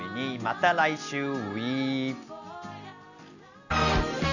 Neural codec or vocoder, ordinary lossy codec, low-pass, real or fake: none; none; 7.2 kHz; real